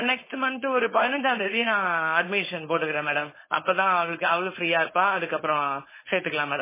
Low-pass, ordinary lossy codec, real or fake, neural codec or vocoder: 3.6 kHz; MP3, 16 kbps; fake; codec, 16 kHz, 4.8 kbps, FACodec